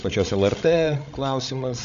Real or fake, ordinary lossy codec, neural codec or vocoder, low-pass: fake; MP3, 64 kbps; codec, 16 kHz, 16 kbps, FreqCodec, larger model; 7.2 kHz